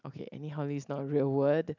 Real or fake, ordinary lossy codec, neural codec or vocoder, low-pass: real; none; none; 7.2 kHz